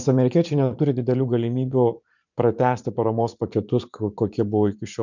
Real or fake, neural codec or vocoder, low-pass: fake; vocoder, 44.1 kHz, 80 mel bands, Vocos; 7.2 kHz